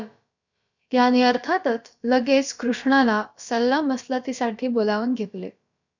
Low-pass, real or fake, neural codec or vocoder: 7.2 kHz; fake; codec, 16 kHz, about 1 kbps, DyCAST, with the encoder's durations